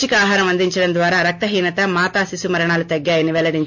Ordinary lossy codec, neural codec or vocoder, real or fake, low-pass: MP3, 48 kbps; none; real; 7.2 kHz